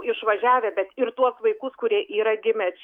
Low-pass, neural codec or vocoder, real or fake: 19.8 kHz; autoencoder, 48 kHz, 128 numbers a frame, DAC-VAE, trained on Japanese speech; fake